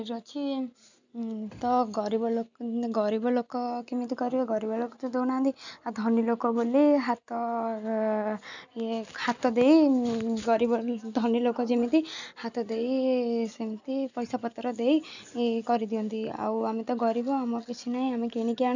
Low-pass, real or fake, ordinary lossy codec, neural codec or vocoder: 7.2 kHz; real; none; none